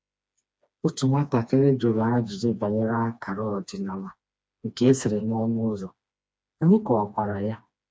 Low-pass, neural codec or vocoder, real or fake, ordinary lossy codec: none; codec, 16 kHz, 2 kbps, FreqCodec, smaller model; fake; none